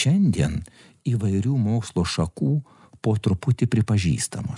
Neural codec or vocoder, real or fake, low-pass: none; real; 10.8 kHz